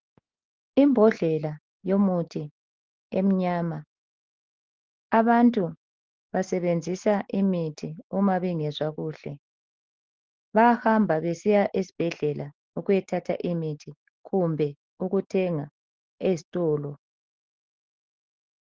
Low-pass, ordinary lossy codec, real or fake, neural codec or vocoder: 7.2 kHz; Opus, 16 kbps; real; none